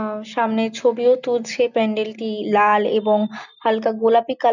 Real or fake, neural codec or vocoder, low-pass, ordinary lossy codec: real; none; 7.2 kHz; none